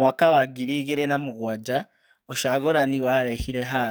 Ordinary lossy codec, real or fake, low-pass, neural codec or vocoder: none; fake; none; codec, 44.1 kHz, 2.6 kbps, SNAC